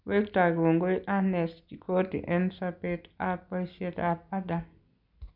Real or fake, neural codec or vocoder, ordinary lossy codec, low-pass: real; none; none; 5.4 kHz